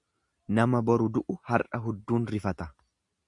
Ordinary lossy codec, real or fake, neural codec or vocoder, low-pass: Opus, 64 kbps; real; none; 10.8 kHz